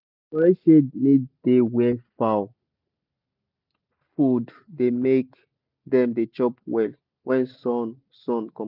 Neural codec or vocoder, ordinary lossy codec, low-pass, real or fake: none; none; 5.4 kHz; real